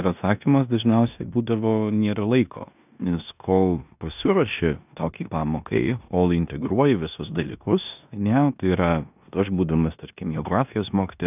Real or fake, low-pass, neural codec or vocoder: fake; 3.6 kHz; codec, 16 kHz in and 24 kHz out, 0.9 kbps, LongCat-Audio-Codec, four codebook decoder